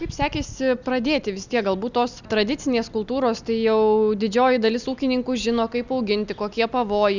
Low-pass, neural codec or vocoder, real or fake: 7.2 kHz; none; real